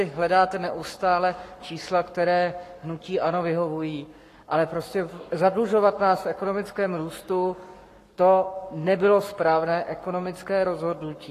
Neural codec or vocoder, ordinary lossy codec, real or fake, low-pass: codec, 44.1 kHz, 7.8 kbps, Pupu-Codec; AAC, 48 kbps; fake; 14.4 kHz